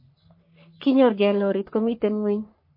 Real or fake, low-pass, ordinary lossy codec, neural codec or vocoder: fake; 5.4 kHz; MP3, 32 kbps; codec, 44.1 kHz, 3.4 kbps, Pupu-Codec